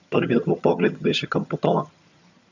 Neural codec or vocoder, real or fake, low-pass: vocoder, 22.05 kHz, 80 mel bands, HiFi-GAN; fake; 7.2 kHz